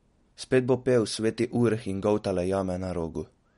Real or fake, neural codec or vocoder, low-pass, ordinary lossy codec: real; none; 10.8 kHz; MP3, 48 kbps